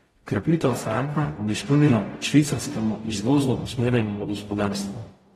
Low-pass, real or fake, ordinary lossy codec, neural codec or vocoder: 19.8 kHz; fake; AAC, 32 kbps; codec, 44.1 kHz, 0.9 kbps, DAC